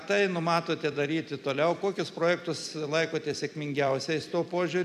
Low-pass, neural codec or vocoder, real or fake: 14.4 kHz; vocoder, 44.1 kHz, 128 mel bands every 256 samples, BigVGAN v2; fake